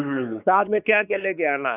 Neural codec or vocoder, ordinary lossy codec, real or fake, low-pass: codec, 16 kHz, 4 kbps, X-Codec, WavLM features, trained on Multilingual LibriSpeech; none; fake; 3.6 kHz